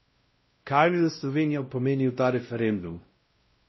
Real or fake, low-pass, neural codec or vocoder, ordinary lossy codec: fake; 7.2 kHz; codec, 16 kHz, 0.5 kbps, X-Codec, WavLM features, trained on Multilingual LibriSpeech; MP3, 24 kbps